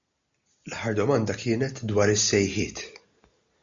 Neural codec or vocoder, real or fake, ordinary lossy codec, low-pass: none; real; MP3, 64 kbps; 7.2 kHz